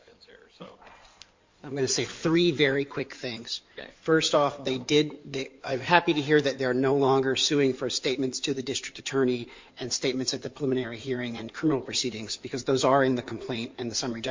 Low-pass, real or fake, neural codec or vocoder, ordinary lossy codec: 7.2 kHz; fake; codec, 16 kHz in and 24 kHz out, 2.2 kbps, FireRedTTS-2 codec; MP3, 48 kbps